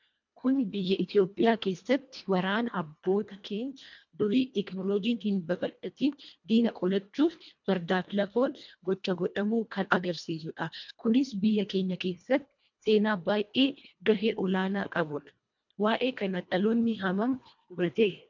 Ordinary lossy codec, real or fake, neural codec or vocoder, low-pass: MP3, 64 kbps; fake; codec, 24 kHz, 1.5 kbps, HILCodec; 7.2 kHz